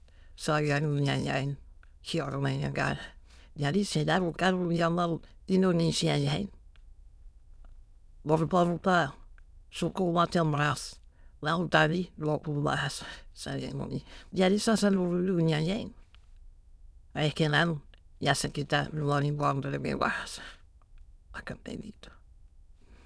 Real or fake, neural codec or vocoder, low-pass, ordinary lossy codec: fake; autoencoder, 22.05 kHz, a latent of 192 numbers a frame, VITS, trained on many speakers; none; none